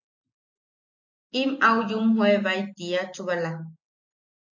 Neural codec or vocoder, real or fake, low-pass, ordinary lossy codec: none; real; 7.2 kHz; AAC, 48 kbps